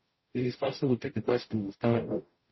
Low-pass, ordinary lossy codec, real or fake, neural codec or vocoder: 7.2 kHz; MP3, 24 kbps; fake; codec, 44.1 kHz, 0.9 kbps, DAC